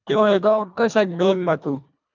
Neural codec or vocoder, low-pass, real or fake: codec, 24 kHz, 1.5 kbps, HILCodec; 7.2 kHz; fake